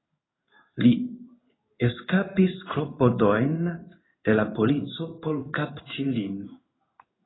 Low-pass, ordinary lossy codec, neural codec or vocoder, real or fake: 7.2 kHz; AAC, 16 kbps; codec, 16 kHz in and 24 kHz out, 1 kbps, XY-Tokenizer; fake